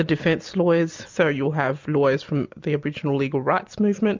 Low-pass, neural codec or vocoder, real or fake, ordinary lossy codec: 7.2 kHz; none; real; MP3, 64 kbps